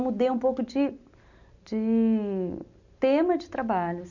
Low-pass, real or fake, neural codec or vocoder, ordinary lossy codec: 7.2 kHz; real; none; none